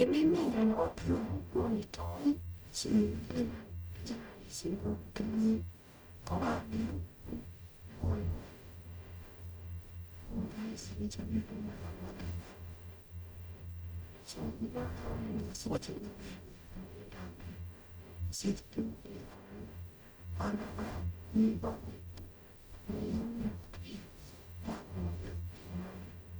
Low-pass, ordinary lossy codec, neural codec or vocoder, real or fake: none; none; codec, 44.1 kHz, 0.9 kbps, DAC; fake